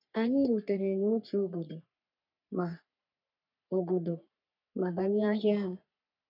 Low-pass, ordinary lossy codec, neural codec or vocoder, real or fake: 5.4 kHz; none; codec, 44.1 kHz, 3.4 kbps, Pupu-Codec; fake